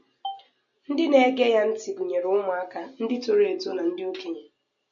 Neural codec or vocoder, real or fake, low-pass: none; real; 7.2 kHz